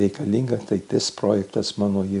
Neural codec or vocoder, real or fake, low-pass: none; real; 10.8 kHz